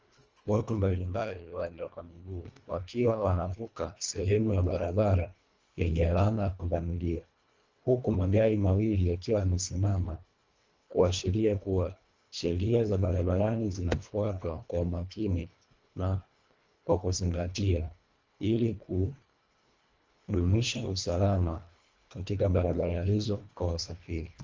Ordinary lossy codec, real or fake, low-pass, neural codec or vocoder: Opus, 24 kbps; fake; 7.2 kHz; codec, 24 kHz, 1.5 kbps, HILCodec